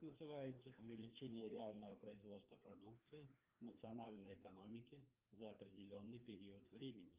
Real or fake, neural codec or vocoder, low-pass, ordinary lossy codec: fake; codec, 16 kHz, 2 kbps, FreqCodec, larger model; 3.6 kHz; Opus, 32 kbps